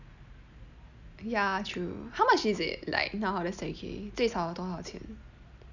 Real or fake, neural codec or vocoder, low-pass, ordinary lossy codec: real; none; 7.2 kHz; none